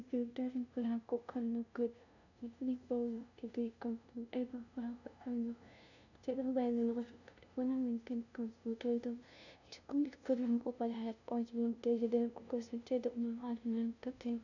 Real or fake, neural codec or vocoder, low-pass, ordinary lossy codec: fake; codec, 16 kHz, 0.5 kbps, FunCodec, trained on LibriTTS, 25 frames a second; 7.2 kHz; none